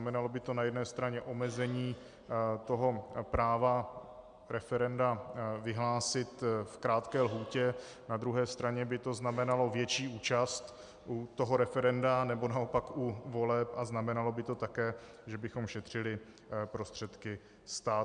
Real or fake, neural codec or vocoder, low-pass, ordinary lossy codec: real; none; 9.9 kHz; MP3, 96 kbps